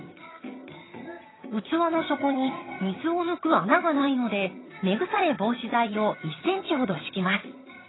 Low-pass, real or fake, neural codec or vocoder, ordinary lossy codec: 7.2 kHz; fake; vocoder, 22.05 kHz, 80 mel bands, HiFi-GAN; AAC, 16 kbps